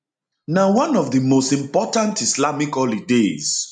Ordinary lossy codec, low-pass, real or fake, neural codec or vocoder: none; 9.9 kHz; real; none